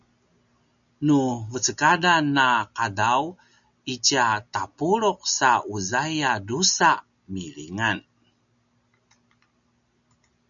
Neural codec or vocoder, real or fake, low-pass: none; real; 7.2 kHz